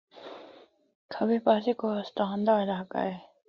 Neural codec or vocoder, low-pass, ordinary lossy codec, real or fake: none; 7.2 kHz; Opus, 64 kbps; real